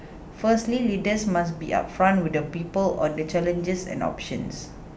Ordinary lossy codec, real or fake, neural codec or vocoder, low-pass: none; real; none; none